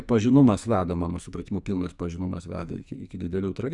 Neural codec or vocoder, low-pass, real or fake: codec, 44.1 kHz, 2.6 kbps, SNAC; 10.8 kHz; fake